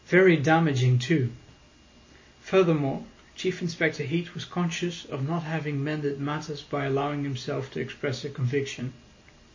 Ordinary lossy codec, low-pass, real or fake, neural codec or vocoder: MP3, 32 kbps; 7.2 kHz; real; none